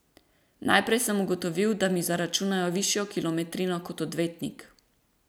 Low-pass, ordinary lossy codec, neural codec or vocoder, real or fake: none; none; none; real